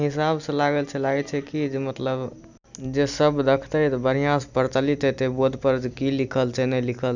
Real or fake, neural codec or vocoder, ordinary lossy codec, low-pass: real; none; none; 7.2 kHz